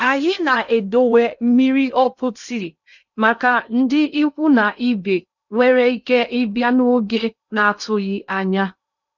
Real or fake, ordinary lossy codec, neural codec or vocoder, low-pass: fake; none; codec, 16 kHz in and 24 kHz out, 0.6 kbps, FocalCodec, streaming, 2048 codes; 7.2 kHz